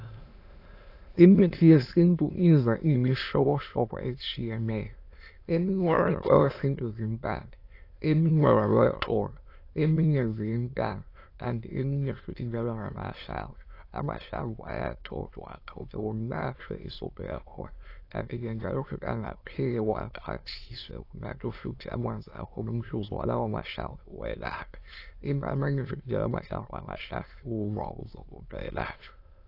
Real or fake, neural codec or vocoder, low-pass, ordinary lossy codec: fake; autoencoder, 22.05 kHz, a latent of 192 numbers a frame, VITS, trained on many speakers; 5.4 kHz; AAC, 32 kbps